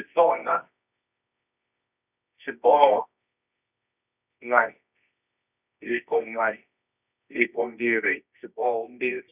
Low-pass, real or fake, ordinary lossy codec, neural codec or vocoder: 3.6 kHz; fake; none; codec, 24 kHz, 0.9 kbps, WavTokenizer, medium music audio release